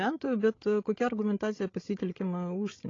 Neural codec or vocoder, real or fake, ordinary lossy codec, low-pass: codec, 16 kHz, 16 kbps, FreqCodec, larger model; fake; AAC, 32 kbps; 7.2 kHz